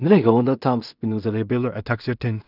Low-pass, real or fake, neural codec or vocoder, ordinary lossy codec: 5.4 kHz; fake; codec, 16 kHz in and 24 kHz out, 0.4 kbps, LongCat-Audio-Codec, two codebook decoder; none